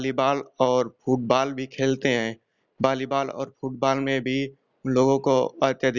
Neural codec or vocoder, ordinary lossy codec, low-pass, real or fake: none; none; 7.2 kHz; real